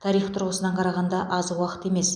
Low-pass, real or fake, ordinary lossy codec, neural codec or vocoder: 9.9 kHz; real; none; none